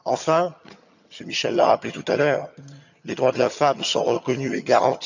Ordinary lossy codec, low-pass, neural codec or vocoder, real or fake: none; 7.2 kHz; vocoder, 22.05 kHz, 80 mel bands, HiFi-GAN; fake